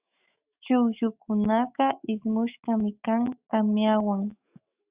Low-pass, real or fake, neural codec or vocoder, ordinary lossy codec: 3.6 kHz; fake; autoencoder, 48 kHz, 128 numbers a frame, DAC-VAE, trained on Japanese speech; Opus, 64 kbps